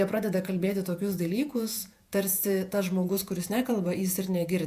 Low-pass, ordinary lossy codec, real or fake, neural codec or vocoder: 14.4 kHz; AAC, 96 kbps; fake; vocoder, 48 kHz, 128 mel bands, Vocos